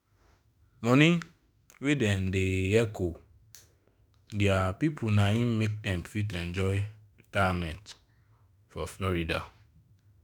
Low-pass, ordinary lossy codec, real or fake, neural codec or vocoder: none; none; fake; autoencoder, 48 kHz, 32 numbers a frame, DAC-VAE, trained on Japanese speech